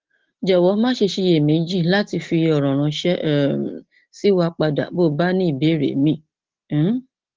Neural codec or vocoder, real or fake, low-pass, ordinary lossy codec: none; real; 7.2 kHz; Opus, 16 kbps